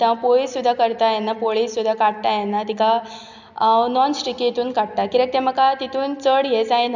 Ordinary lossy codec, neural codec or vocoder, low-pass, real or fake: none; none; 7.2 kHz; real